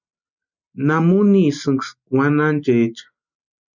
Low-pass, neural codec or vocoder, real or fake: 7.2 kHz; none; real